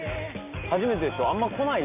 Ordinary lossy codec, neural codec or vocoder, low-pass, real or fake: none; none; 3.6 kHz; real